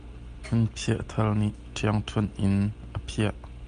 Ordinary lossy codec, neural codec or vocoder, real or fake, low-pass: Opus, 32 kbps; none; real; 9.9 kHz